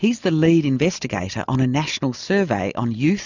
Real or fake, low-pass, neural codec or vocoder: real; 7.2 kHz; none